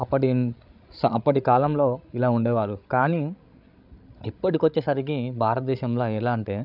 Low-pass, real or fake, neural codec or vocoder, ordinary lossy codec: 5.4 kHz; fake; codec, 16 kHz, 16 kbps, FreqCodec, larger model; none